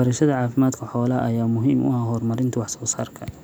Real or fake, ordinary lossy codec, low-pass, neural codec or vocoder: real; none; none; none